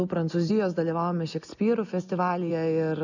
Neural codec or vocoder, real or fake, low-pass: none; real; 7.2 kHz